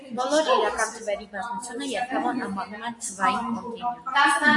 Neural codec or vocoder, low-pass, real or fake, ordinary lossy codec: none; 10.8 kHz; real; AAC, 64 kbps